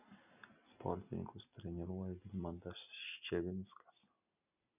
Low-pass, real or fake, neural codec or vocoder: 3.6 kHz; real; none